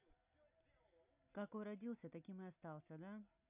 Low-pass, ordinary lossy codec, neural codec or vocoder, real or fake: 3.6 kHz; none; none; real